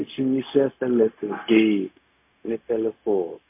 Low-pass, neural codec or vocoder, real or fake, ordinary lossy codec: 3.6 kHz; codec, 16 kHz, 0.4 kbps, LongCat-Audio-Codec; fake; MP3, 24 kbps